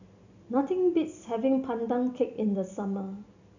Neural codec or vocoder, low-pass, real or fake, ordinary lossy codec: none; 7.2 kHz; real; none